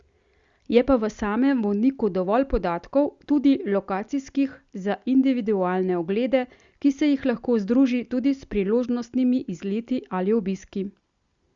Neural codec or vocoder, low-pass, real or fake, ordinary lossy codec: none; 7.2 kHz; real; Opus, 64 kbps